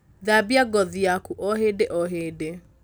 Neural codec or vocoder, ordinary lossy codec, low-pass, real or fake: none; none; none; real